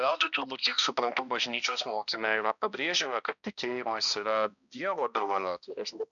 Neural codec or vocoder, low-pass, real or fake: codec, 16 kHz, 1 kbps, X-Codec, HuBERT features, trained on balanced general audio; 7.2 kHz; fake